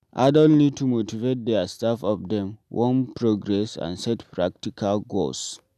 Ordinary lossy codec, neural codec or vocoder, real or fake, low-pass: none; none; real; 14.4 kHz